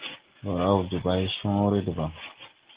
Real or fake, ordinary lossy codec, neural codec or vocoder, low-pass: real; Opus, 16 kbps; none; 3.6 kHz